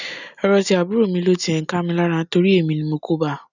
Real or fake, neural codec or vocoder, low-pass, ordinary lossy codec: real; none; 7.2 kHz; none